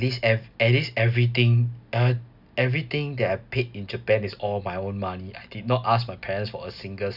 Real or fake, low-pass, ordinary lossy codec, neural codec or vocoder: real; 5.4 kHz; none; none